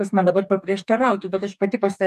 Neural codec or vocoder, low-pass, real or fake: codec, 44.1 kHz, 2.6 kbps, DAC; 14.4 kHz; fake